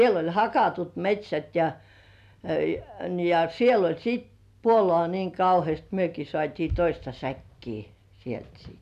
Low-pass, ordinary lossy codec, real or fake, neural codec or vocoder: 14.4 kHz; none; real; none